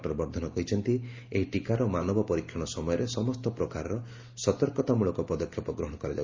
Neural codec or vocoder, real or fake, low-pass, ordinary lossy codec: none; real; 7.2 kHz; Opus, 32 kbps